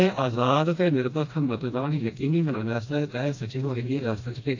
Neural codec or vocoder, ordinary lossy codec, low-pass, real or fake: codec, 16 kHz, 1 kbps, FreqCodec, smaller model; AAC, 48 kbps; 7.2 kHz; fake